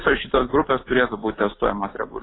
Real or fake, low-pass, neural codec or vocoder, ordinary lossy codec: fake; 7.2 kHz; vocoder, 44.1 kHz, 128 mel bands every 256 samples, BigVGAN v2; AAC, 16 kbps